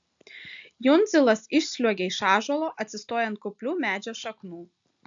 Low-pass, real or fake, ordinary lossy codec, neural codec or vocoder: 7.2 kHz; real; AAC, 64 kbps; none